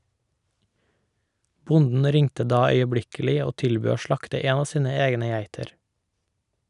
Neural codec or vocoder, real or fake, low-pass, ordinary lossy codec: none; real; 10.8 kHz; none